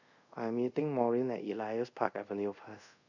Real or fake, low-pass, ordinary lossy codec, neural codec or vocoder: fake; 7.2 kHz; none; codec, 24 kHz, 0.5 kbps, DualCodec